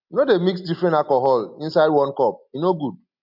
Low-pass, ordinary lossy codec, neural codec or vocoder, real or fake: 5.4 kHz; MP3, 48 kbps; none; real